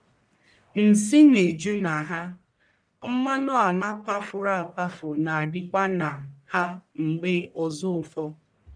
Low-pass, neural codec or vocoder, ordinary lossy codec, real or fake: 9.9 kHz; codec, 44.1 kHz, 1.7 kbps, Pupu-Codec; none; fake